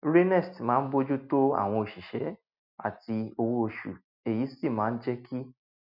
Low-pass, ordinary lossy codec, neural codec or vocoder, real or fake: 5.4 kHz; none; none; real